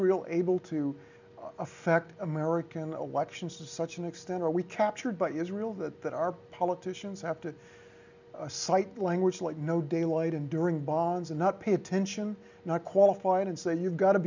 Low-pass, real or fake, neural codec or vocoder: 7.2 kHz; real; none